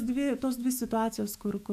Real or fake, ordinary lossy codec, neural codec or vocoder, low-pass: fake; AAC, 96 kbps; autoencoder, 48 kHz, 128 numbers a frame, DAC-VAE, trained on Japanese speech; 14.4 kHz